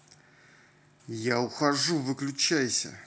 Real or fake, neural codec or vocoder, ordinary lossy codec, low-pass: real; none; none; none